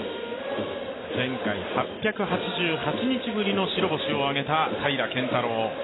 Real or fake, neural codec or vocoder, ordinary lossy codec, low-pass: real; none; AAC, 16 kbps; 7.2 kHz